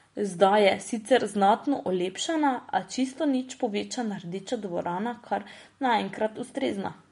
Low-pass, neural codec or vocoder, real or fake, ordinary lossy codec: 10.8 kHz; none; real; MP3, 48 kbps